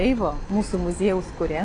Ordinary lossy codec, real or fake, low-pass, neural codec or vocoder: AAC, 32 kbps; real; 9.9 kHz; none